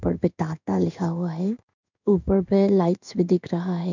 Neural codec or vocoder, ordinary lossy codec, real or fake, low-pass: codec, 16 kHz in and 24 kHz out, 1 kbps, XY-Tokenizer; none; fake; 7.2 kHz